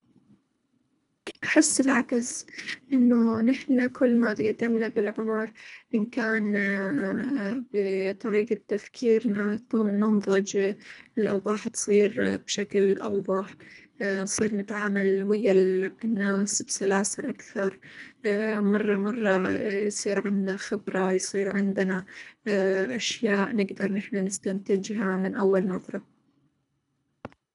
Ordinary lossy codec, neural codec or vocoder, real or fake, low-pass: none; codec, 24 kHz, 1.5 kbps, HILCodec; fake; 10.8 kHz